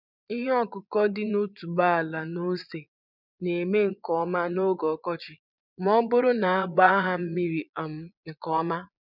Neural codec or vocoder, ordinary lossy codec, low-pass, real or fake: vocoder, 22.05 kHz, 80 mel bands, Vocos; none; 5.4 kHz; fake